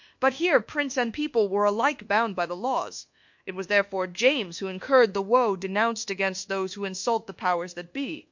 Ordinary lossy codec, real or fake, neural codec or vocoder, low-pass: MP3, 48 kbps; fake; codec, 24 kHz, 1.2 kbps, DualCodec; 7.2 kHz